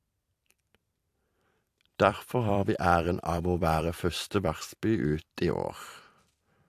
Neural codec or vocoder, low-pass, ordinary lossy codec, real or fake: vocoder, 44.1 kHz, 128 mel bands every 256 samples, BigVGAN v2; 14.4 kHz; AAC, 64 kbps; fake